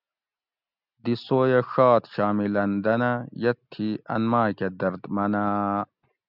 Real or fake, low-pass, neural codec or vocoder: real; 5.4 kHz; none